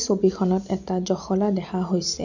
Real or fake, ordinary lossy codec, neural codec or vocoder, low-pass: real; none; none; 7.2 kHz